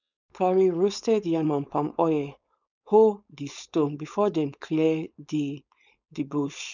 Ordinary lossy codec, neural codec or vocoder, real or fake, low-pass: none; codec, 16 kHz, 4.8 kbps, FACodec; fake; 7.2 kHz